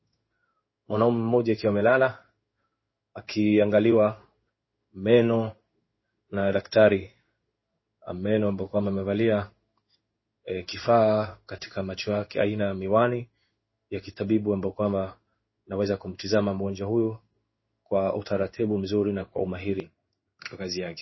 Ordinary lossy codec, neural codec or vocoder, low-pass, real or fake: MP3, 24 kbps; codec, 16 kHz in and 24 kHz out, 1 kbps, XY-Tokenizer; 7.2 kHz; fake